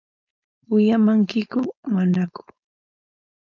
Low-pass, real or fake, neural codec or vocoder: 7.2 kHz; fake; codec, 16 kHz, 4.8 kbps, FACodec